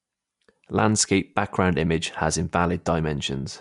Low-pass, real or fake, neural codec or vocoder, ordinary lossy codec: 10.8 kHz; real; none; MP3, 64 kbps